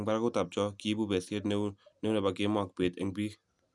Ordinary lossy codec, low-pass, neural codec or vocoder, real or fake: none; none; none; real